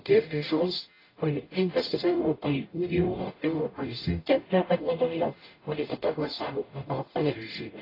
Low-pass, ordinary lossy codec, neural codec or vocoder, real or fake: 5.4 kHz; AAC, 24 kbps; codec, 44.1 kHz, 0.9 kbps, DAC; fake